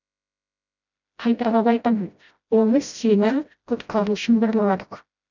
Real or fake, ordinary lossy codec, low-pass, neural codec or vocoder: fake; none; 7.2 kHz; codec, 16 kHz, 0.5 kbps, FreqCodec, smaller model